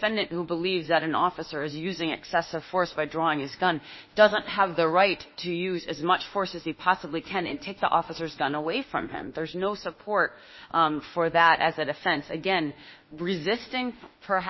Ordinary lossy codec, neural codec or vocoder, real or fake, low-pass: MP3, 24 kbps; autoencoder, 48 kHz, 32 numbers a frame, DAC-VAE, trained on Japanese speech; fake; 7.2 kHz